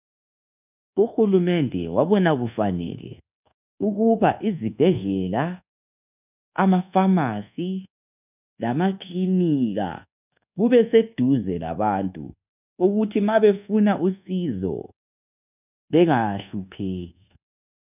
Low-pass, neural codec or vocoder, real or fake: 3.6 kHz; codec, 24 kHz, 1.2 kbps, DualCodec; fake